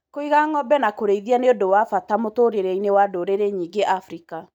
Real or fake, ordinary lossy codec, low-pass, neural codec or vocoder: real; none; 19.8 kHz; none